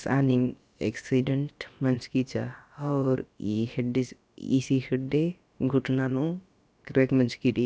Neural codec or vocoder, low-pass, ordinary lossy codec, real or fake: codec, 16 kHz, about 1 kbps, DyCAST, with the encoder's durations; none; none; fake